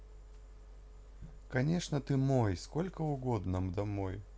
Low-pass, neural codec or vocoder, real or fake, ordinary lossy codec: none; none; real; none